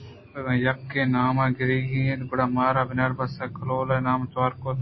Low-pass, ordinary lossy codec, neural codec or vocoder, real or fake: 7.2 kHz; MP3, 24 kbps; none; real